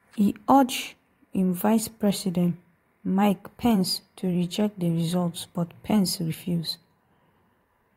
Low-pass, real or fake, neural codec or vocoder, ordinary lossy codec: 19.8 kHz; fake; vocoder, 44.1 kHz, 128 mel bands every 512 samples, BigVGAN v2; AAC, 48 kbps